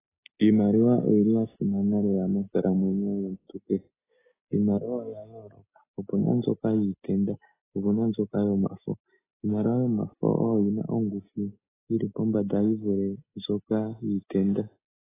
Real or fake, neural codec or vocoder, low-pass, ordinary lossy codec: real; none; 3.6 kHz; AAC, 16 kbps